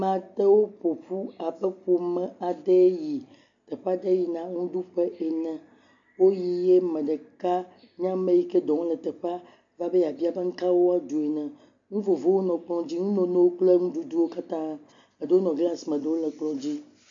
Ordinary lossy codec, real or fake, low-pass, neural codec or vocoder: AAC, 64 kbps; real; 7.2 kHz; none